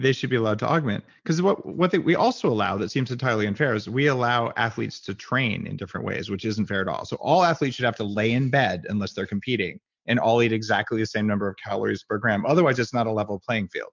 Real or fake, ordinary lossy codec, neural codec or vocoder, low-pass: real; MP3, 64 kbps; none; 7.2 kHz